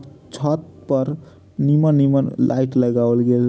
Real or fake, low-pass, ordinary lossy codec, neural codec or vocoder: real; none; none; none